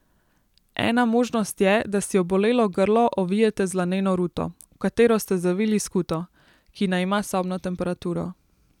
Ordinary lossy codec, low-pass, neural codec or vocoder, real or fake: none; 19.8 kHz; none; real